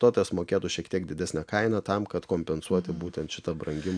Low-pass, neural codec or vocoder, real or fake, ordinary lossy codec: 9.9 kHz; none; real; AAC, 64 kbps